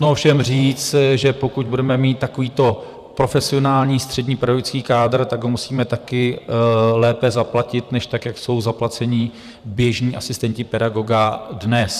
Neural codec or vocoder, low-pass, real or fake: vocoder, 44.1 kHz, 128 mel bands, Pupu-Vocoder; 14.4 kHz; fake